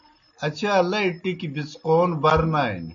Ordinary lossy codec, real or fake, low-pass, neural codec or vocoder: MP3, 48 kbps; real; 7.2 kHz; none